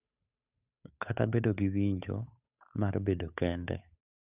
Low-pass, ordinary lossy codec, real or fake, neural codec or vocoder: 3.6 kHz; none; fake; codec, 16 kHz, 8 kbps, FunCodec, trained on Chinese and English, 25 frames a second